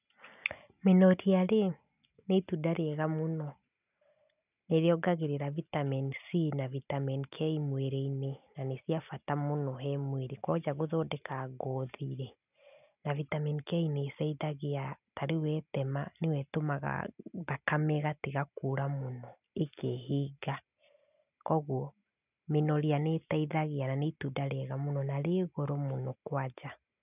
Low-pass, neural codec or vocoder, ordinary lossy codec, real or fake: 3.6 kHz; none; none; real